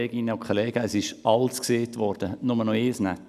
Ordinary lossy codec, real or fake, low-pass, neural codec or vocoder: none; real; 14.4 kHz; none